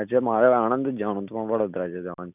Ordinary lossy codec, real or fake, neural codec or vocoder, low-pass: none; real; none; 3.6 kHz